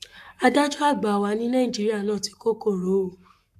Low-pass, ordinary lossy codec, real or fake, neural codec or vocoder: 14.4 kHz; AAC, 96 kbps; fake; codec, 44.1 kHz, 7.8 kbps, Pupu-Codec